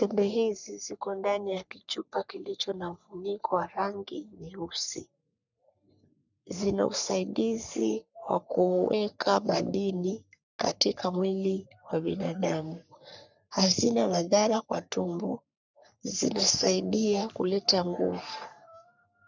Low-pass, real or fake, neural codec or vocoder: 7.2 kHz; fake; codec, 44.1 kHz, 3.4 kbps, Pupu-Codec